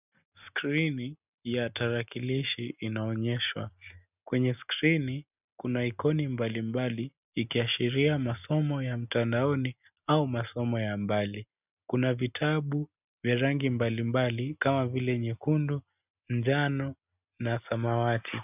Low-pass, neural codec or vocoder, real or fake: 3.6 kHz; none; real